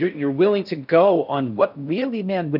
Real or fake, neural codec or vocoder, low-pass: fake; codec, 16 kHz in and 24 kHz out, 0.6 kbps, FocalCodec, streaming, 4096 codes; 5.4 kHz